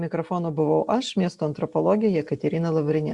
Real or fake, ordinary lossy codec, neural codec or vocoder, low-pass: real; Opus, 64 kbps; none; 10.8 kHz